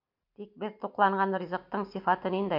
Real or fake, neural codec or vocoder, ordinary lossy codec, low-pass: real; none; MP3, 48 kbps; 5.4 kHz